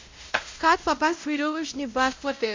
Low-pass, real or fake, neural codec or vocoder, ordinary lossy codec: 7.2 kHz; fake; codec, 16 kHz, 0.5 kbps, X-Codec, WavLM features, trained on Multilingual LibriSpeech; MP3, 64 kbps